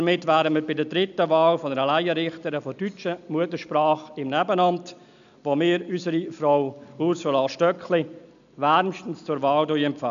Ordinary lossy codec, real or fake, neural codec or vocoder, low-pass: none; real; none; 7.2 kHz